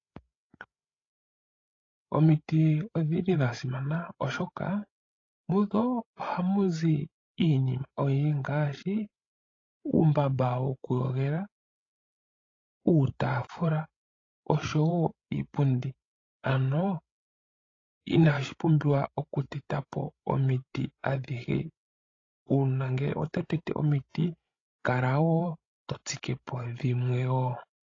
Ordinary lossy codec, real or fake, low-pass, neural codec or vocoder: AAC, 32 kbps; fake; 7.2 kHz; codec, 16 kHz, 16 kbps, FreqCodec, larger model